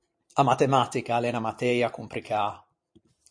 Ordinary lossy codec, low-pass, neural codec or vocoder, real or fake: MP3, 48 kbps; 9.9 kHz; vocoder, 44.1 kHz, 128 mel bands every 512 samples, BigVGAN v2; fake